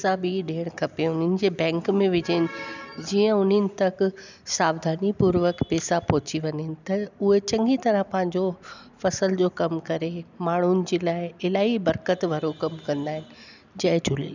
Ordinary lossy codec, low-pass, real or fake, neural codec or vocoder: none; 7.2 kHz; real; none